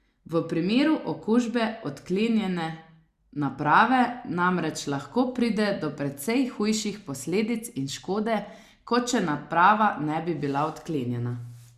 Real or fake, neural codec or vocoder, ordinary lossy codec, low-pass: real; none; Opus, 64 kbps; 14.4 kHz